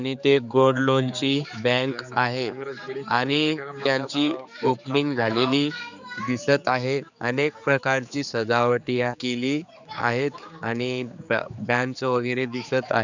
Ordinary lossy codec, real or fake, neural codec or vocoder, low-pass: none; fake; codec, 16 kHz, 4 kbps, X-Codec, HuBERT features, trained on general audio; 7.2 kHz